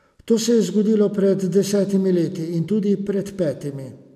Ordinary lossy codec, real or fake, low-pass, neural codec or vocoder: AAC, 96 kbps; real; 14.4 kHz; none